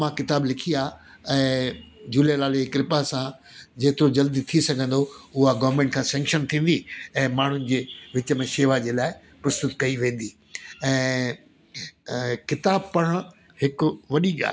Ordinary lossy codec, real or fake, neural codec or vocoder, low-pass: none; real; none; none